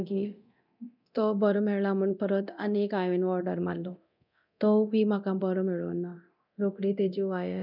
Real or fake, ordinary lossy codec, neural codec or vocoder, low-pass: fake; none; codec, 24 kHz, 0.9 kbps, DualCodec; 5.4 kHz